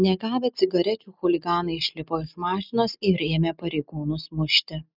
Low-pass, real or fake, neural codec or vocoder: 5.4 kHz; real; none